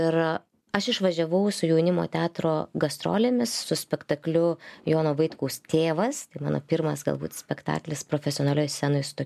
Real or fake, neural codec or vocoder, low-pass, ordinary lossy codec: real; none; 14.4 kHz; MP3, 96 kbps